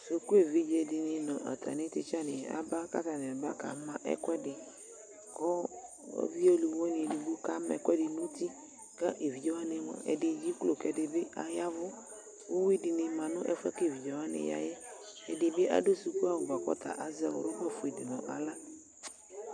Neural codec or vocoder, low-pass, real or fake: none; 9.9 kHz; real